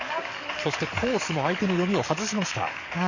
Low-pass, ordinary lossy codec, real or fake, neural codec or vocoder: 7.2 kHz; none; fake; codec, 44.1 kHz, 7.8 kbps, DAC